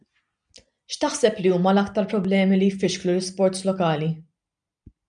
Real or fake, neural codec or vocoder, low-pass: real; none; 9.9 kHz